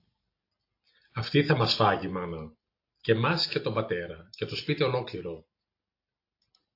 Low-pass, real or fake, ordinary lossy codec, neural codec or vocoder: 5.4 kHz; real; AAC, 32 kbps; none